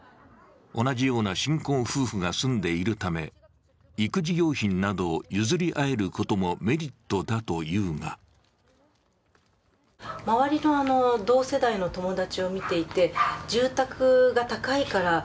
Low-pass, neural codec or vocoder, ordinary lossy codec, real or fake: none; none; none; real